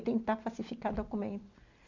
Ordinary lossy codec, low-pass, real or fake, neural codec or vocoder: Opus, 64 kbps; 7.2 kHz; real; none